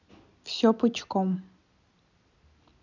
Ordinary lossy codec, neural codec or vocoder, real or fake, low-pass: none; none; real; 7.2 kHz